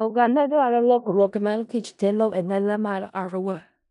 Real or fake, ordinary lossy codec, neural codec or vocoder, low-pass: fake; none; codec, 16 kHz in and 24 kHz out, 0.4 kbps, LongCat-Audio-Codec, four codebook decoder; 10.8 kHz